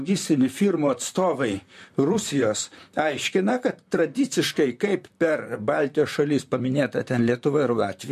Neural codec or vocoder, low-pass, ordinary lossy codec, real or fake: vocoder, 44.1 kHz, 128 mel bands, Pupu-Vocoder; 14.4 kHz; MP3, 64 kbps; fake